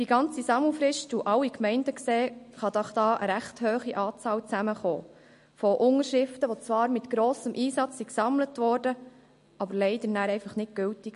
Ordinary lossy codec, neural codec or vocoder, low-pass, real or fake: MP3, 48 kbps; none; 10.8 kHz; real